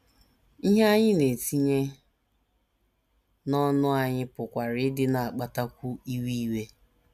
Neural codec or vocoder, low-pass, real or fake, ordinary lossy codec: none; 14.4 kHz; real; none